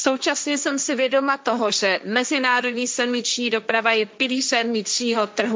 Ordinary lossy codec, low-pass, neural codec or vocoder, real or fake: none; none; codec, 16 kHz, 1.1 kbps, Voila-Tokenizer; fake